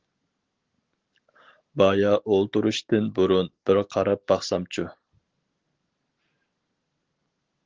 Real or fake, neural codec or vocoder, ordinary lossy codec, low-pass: real; none; Opus, 16 kbps; 7.2 kHz